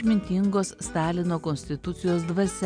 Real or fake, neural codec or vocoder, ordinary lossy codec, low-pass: real; none; MP3, 96 kbps; 9.9 kHz